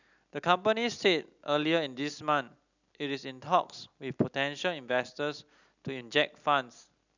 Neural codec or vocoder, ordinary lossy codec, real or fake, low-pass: none; none; real; 7.2 kHz